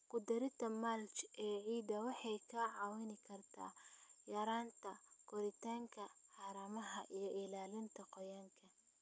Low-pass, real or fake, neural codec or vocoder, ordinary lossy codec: none; real; none; none